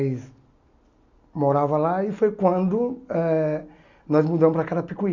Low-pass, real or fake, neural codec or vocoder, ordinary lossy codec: 7.2 kHz; real; none; Opus, 64 kbps